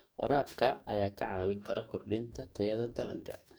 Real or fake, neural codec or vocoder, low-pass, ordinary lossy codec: fake; codec, 44.1 kHz, 2.6 kbps, DAC; none; none